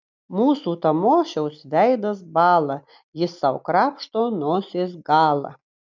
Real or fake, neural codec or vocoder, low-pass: real; none; 7.2 kHz